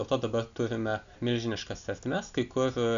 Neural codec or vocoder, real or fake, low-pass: none; real; 7.2 kHz